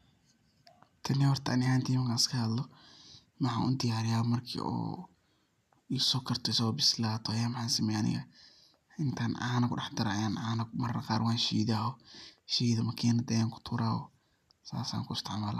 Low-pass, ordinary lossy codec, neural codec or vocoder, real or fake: 14.4 kHz; none; none; real